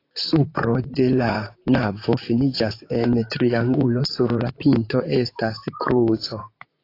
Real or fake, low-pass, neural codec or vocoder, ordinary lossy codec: fake; 5.4 kHz; vocoder, 44.1 kHz, 128 mel bands, Pupu-Vocoder; AAC, 32 kbps